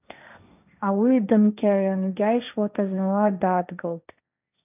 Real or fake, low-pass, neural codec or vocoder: fake; 3.6 kHz; codec, 16 kHz, 1.1 kbps, Voila-Tokenizer